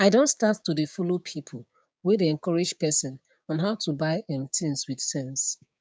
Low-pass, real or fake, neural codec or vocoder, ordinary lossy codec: none; fake; codec, 16 kHz, 6 kbps, DAC; none